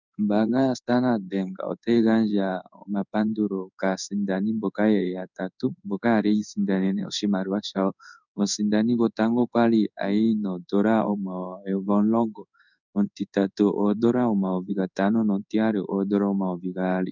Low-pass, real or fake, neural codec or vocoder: 7.2 kHz; fake; codec, 16 kHz in and 24 kHz out, 1 kbps, XY-Tokenizer